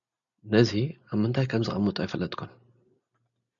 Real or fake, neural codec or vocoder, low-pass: real; none; 7.2 kHz